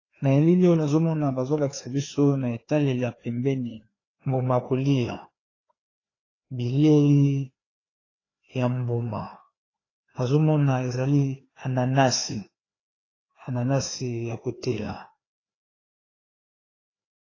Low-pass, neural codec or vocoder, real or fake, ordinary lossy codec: 7.2 kHz; codec, 16 kHz, 2 kbps, FreqCodec, larger model; fake; AAC, 32 kbps